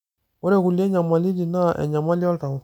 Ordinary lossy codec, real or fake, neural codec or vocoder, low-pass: none; real; none; 19.8 kHz